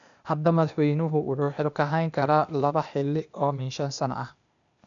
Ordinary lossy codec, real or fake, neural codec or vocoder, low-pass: none; fake; codec, 16 kHz, 0.8 kbps, ZipCodec; 7.2 kHz